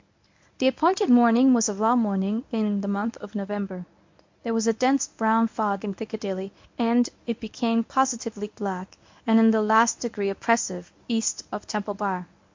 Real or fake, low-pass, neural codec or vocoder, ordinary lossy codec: fake; 7.2 kHz; codec, 24 kHz, 0.9 kbps, WavTokenizer, medium speech release version 1; MP3, 48 kbps